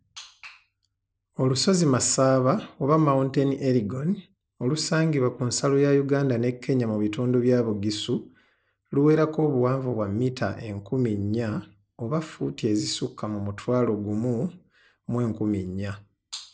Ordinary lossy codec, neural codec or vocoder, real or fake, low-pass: none; none; real; none